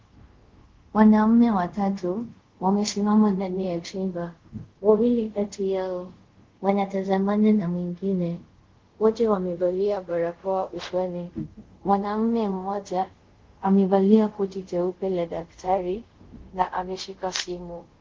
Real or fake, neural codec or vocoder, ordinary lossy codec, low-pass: fake; codec, 24 kHz, 0.5 kbps, DualCodec; Opus, 16 kbps; 7.2 kHz